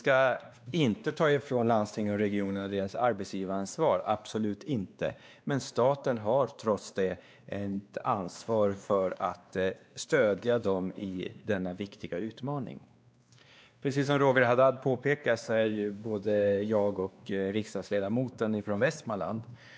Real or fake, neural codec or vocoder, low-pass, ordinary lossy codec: fake; codec, 16 kHz, 2 kbps, X-Codec, WavLM features, trained on Multilingual LibriSpeech; none; none